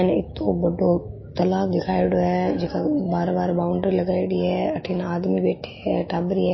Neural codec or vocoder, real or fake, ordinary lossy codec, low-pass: autoencoder, 48 kHz, 128 numbers a frame, DAC-VAE, trained on Japanese speech; fake; MP3, 24 kbps; 7.2 kHz